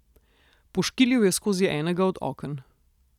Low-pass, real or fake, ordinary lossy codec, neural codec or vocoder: 19.8 kHz; real; none; none